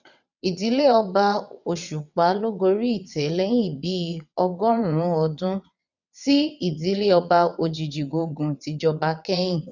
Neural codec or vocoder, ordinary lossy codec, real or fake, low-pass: vocoder, 22.05 kHz, 80 mel bands, WaveNeXt; none; fake; 7.2 kHz